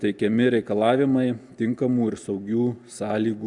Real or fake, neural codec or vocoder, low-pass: real; none; 10.8 kHz